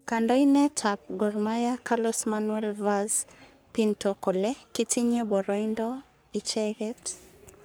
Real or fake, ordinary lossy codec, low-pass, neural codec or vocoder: fake; none; none; codec, 44.1 kHz, 3.4 kbps, Pupu-Codec